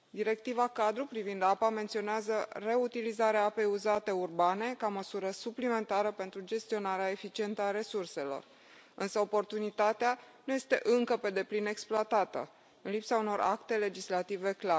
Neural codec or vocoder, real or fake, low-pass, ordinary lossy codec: none; real; none; none